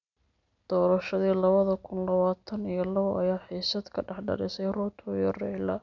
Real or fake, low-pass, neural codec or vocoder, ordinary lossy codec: real; 7.2 kHz; none; none